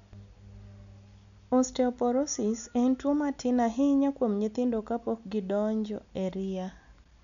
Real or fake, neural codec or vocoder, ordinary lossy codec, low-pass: real; none; none; 7.2 kHz